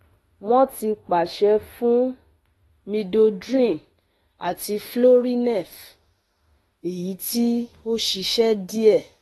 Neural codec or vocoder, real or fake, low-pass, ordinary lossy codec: autoencoder, 48 kHz, 32 numbers a frame, DAC-VAE, trained on Japanese speech; fake; 19.8 kHz; AAC, 32 kbps